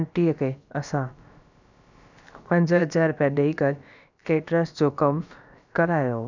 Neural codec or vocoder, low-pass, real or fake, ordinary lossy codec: codec, 16 kHz, about 1 kbps, DyCAST, with the encoder's durations; 7.2 kHz; fake; none